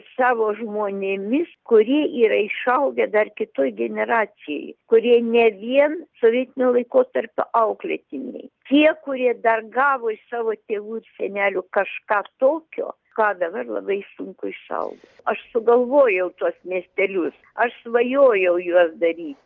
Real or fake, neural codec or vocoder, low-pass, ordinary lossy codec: real; none; 7.2 kHz; Opus, 32 kbps